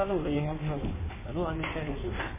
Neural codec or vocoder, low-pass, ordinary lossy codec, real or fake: codec, 16 kHz in and 24 kHz out, 1.1 kbps, FireRedTTS-2 codec; 3.6 kHz; MP3, 16 kbps; fake